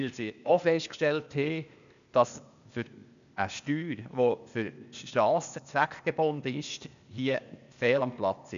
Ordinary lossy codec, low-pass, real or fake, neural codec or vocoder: none; 7.2 kHz; fake; codec, 16 kHz, 0.8 kbps, ZipCodec